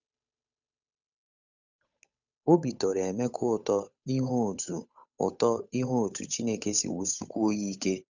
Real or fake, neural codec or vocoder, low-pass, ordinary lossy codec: fake; codec, 16 kHz, 8 kbps, FunCodec, trained on Chinese and English, 25 frames a second; 7.2 kHz; none